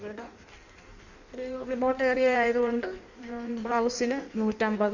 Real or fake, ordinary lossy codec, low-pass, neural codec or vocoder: fake; none; 7.2 kHz; codec, 16 kHz in and 24 kHz out, 1.1 kbps, FireRedTTS-2 codec